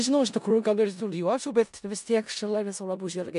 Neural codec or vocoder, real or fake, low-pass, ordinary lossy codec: codec, 16 kHz in and 24 kHz out, 0.4 kbps, LongCat-Audio-Codec, four codebook decoder; fake; 10.8 kHz; MP3, 96 kbps